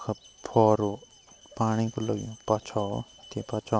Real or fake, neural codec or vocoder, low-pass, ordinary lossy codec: real; none; none; none